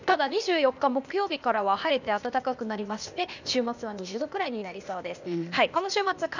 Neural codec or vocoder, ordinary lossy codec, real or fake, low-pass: codec, 16 kHz, 0.8 kbps, ZipCodec; none; fake; 7.2 kHz